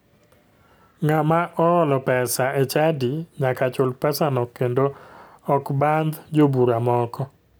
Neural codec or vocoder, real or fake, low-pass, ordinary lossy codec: none; real; none; none